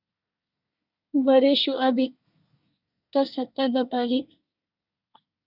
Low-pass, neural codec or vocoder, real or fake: 5.4 kHz; codec, 24 kHz, 1 kbps, SNAC; fake